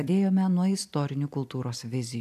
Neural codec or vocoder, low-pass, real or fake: vocoder, 44.1 kHz, 128 mel bands every 256 samples, BigVGAN v2; 14.4 kHz; fake